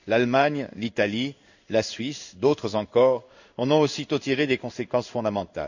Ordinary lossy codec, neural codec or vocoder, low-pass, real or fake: none; codec, 16 kHz in and 24 kHz out, 1 kbps, XY-Tokenizer; 7.2 kHz; fake